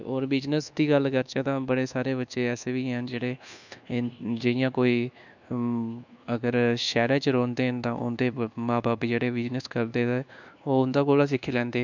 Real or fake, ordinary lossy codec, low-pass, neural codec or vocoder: fake; none; 7.2 kHz; codec, 24 kHz, 1.2 kbps, DualCodec